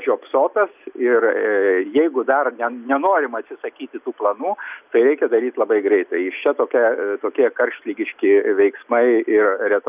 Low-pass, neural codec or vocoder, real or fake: 3.6 kHz; none; real